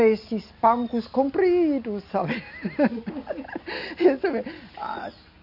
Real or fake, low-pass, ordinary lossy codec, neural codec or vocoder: real; 5.4 kHz; none; none